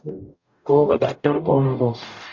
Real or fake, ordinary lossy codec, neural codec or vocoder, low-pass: fake; MP3, 64 kbps; codec, 44.1 kHz, 0.9 kbps, DAC; 7.2 kHz